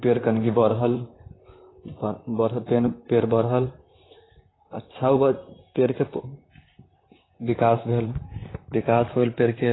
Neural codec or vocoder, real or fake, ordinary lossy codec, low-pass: vocoder, 44.1 kHz, 128 mel bands, Pupu-Vocoder; fake; AAC, 16 kbps; 7.2 kHz